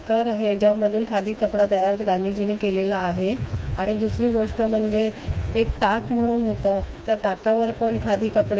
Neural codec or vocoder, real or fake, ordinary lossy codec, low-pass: codec, 16 kHz, 2 kbps, FreqCodec, smaller model; fake; none; none